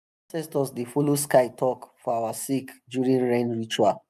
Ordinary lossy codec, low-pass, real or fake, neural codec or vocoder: none; 14.4 kHz; real; none